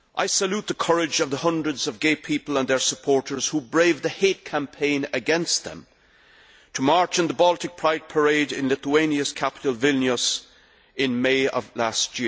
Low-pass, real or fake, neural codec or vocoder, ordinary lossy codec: none; real; none; none